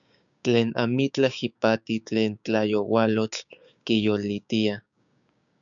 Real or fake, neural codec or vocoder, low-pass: fake; codec, 16 kHz, 6 kbps, DAC; 7.2 kHz